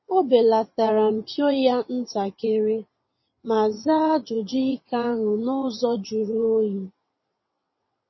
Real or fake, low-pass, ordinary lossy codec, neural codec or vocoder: fake; 7.2 kHz; MP3, 24 kbps; vocoder, 22.05 kHz, 80 mel bands, WaveNeXt